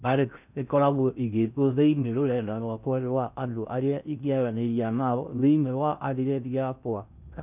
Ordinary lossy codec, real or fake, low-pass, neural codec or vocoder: none; fake; 3.6 kHz; codec, 16 kHz in and 24 kHz out, 0.6 kbps, FocalCodec, streaming, 4096 codes